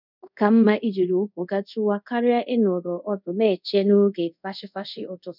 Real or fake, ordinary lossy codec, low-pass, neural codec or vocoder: fake; none; 5.4 kHz; codec, 24 kHz, 0.5 kbps, DualCodec